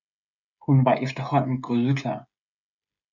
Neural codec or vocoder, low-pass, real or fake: codec, 16 kHz, 16 kbps, FreqCodec, smaller model; 7.2 kHz; fake